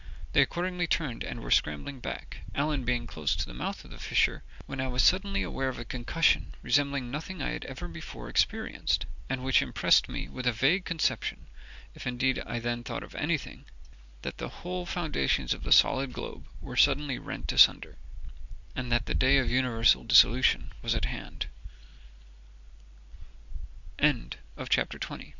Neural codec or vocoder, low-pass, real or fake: none; 7.2 kHz; real